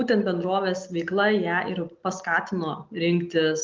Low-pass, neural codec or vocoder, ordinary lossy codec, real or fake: 7.2 kHz; none; Opus, 24 kbps; real